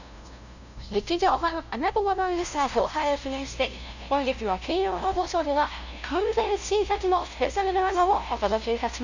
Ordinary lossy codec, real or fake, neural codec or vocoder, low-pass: none; fake; codec, 16 kHz, 0.5 kbps, FunCodec, trained on LibriTTS, 25 frames a second; 7.2 kHz